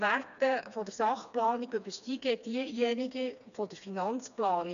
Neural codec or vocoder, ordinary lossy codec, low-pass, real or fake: codec, 16 kHz, 2 kbps, FreqCodec, smaller model; none; 7.2 kHz; fake